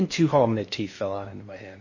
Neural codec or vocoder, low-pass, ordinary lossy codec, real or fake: codec, 16 kHz in and 24 kHz out, 0.8 kbps, FocalCodec, streaming, 65536 codes; 7.2 kHz; MP3, 32 kbps; fake